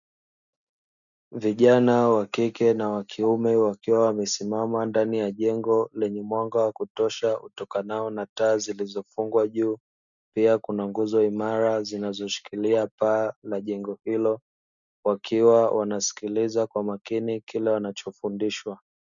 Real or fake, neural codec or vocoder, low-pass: real; none; 7.2 kHz